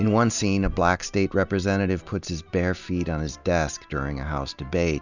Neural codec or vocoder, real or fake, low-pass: none; real; 7.2 kHz